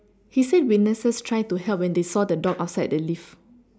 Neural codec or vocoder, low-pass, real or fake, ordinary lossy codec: none; none; real; none